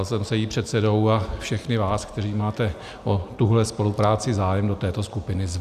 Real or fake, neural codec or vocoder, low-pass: real; none; 14.4 kHz